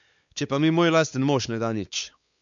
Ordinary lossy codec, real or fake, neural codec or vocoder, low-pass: none; fake; codec, 16 kHz, 4 kbps, X-Codec, WavLM features, trained on Multilingual LibriSpeech; 7.2 kHz